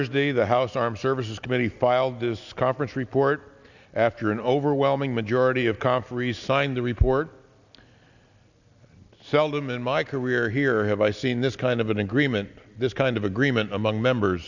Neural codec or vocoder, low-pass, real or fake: none; 7.2 kHz; real